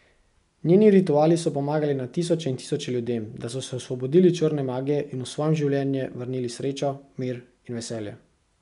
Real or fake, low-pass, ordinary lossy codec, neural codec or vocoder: real; 10.8 kHz; none; none